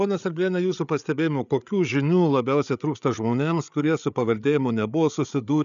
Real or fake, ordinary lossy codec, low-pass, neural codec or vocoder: fake; MP3, 96 kbps; 7.2 kHz; codec, 16 kHz, 8 kbps, FreqCodec, larger model